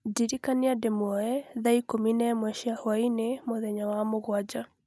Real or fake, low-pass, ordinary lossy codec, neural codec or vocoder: real; none; none; none